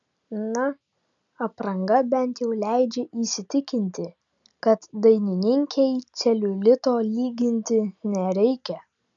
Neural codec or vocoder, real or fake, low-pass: none; real; 7.2 kHz